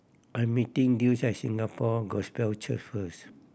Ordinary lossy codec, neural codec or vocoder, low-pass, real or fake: none; none; none; real